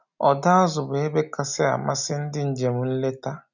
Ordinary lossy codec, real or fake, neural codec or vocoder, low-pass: none; real; none; 7.2 kHz